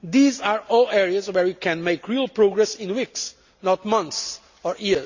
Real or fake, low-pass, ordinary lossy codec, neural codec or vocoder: real; 7.2 kHz; Opus, 64 kbps; none